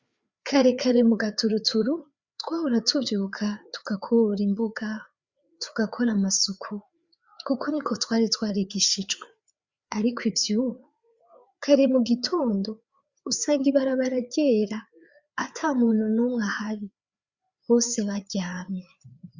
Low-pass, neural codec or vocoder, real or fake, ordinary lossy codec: 7.2 kHz; codec, 16 kHz, 4 kbps, FreqCodec, larger model; fake; Opus, 64 kbps